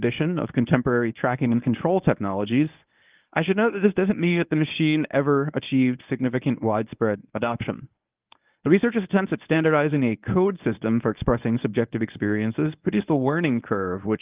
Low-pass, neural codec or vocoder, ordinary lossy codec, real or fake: 3.6 kHz; codec, 24 kHz, 0.9 kbps, WavTokenizer, medium speech release version 1; Opus, 32 kbps; fake